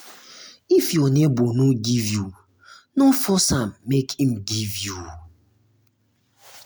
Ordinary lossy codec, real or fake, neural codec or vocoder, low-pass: none; real; none; none